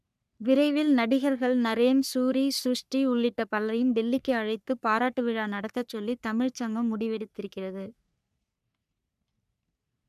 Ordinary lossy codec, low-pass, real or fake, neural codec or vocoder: none; 14.4 kHz; fake; codec, 44.1 kHz, 3.4 kbps, Pupu-Codec